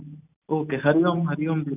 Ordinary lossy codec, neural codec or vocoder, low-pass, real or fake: none; none; 3.6 kHz; real